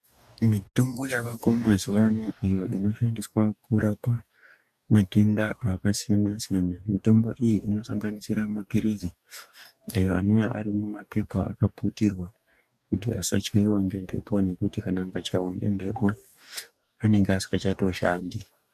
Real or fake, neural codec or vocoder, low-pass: fake; codec, 44.1 kHz, 2.6 kbps, DAC; 14.4 kHz